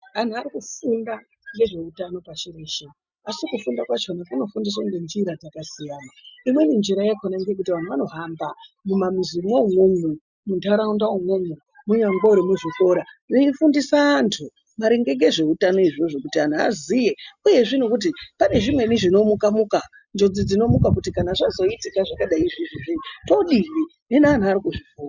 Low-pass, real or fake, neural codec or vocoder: 7.2 kHz; real; none